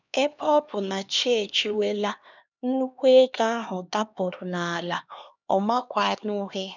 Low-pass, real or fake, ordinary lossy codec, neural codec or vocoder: 7.2 kHz; fake; none; codec, 16 kHz, 2 kbps, X-Codec, HuBERT features, trained on LibriSpeech